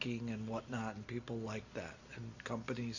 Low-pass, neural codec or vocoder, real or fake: 7.2 kHz; none; real